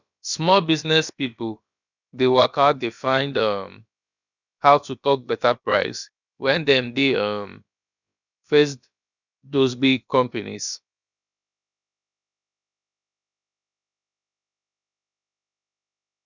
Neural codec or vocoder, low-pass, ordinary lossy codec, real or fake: codec, 16 kHz, about 1 kbps, DyCAST, with the encoder's durations; 7.2 kHz; none; fake